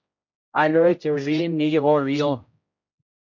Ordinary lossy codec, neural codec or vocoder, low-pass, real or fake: MP3, 48 kbps; codec, 16 kHz, 0.5 kbps, X-Codec, HuBERT features, trained on general audio; 7.2 kHz; fake